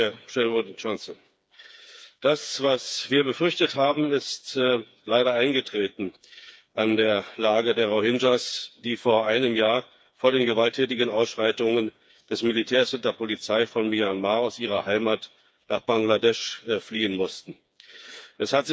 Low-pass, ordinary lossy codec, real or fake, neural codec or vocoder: none; none; fake; codec, 16 kHz, 4 kbps, FreqCodec, smaller model